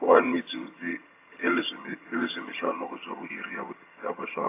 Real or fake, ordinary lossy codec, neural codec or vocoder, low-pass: fake; AAC, 24 kbps; vocoder, 22.05 kHz, 80 mel bands, HiFi-GAN; 3.6 kHz